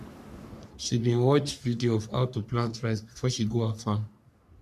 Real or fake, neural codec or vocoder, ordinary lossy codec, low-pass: fake; codec, 44.1 kHz, 3.4 kbps, Pupu-Codec; none; 14.4 kHz